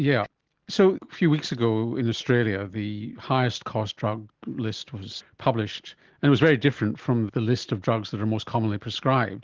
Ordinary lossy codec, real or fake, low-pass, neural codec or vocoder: Opus, 16 kbps; real; 7.2 kHz; none